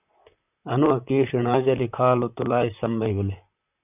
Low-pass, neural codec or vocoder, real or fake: 3.6 kHz; vocoder, 44.1 kHz, 128 mel bands, Pupu-Vocoder; fake